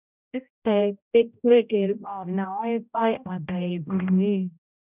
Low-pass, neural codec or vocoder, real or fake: 3.6 kHz; codec, 16 kHz, 0.5 kbps, X-Codec, HuBERT features, trained on general audio; fake